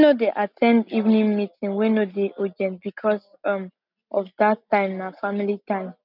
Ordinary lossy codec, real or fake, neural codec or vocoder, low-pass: none; real; none; 5.4 kHz